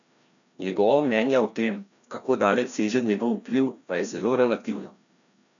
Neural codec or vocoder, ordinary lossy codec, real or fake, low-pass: codec, 16 kHz, 1 kbps, FreqCodec, larger model; none; fake; 7.2 kHz